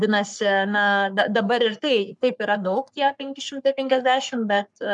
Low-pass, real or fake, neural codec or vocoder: 10.8 kHz; fake; codec, 44.1 kHz, 3.4 kbps, Pupu-Codec